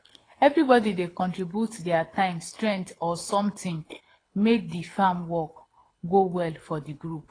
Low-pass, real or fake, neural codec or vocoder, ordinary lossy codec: 9.9 kHz; fake; codec, 24 kHz, 6 kbps, HILCodec; AAC, 32 kbps